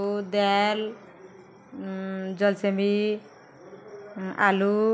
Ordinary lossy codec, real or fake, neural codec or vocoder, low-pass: none; real; none; none